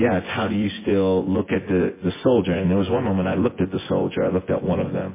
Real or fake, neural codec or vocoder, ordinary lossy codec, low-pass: fake; vocoder, 24 kHz, 100 mel bands, Vocos; MP3, 16 kbps; 3.6 kHz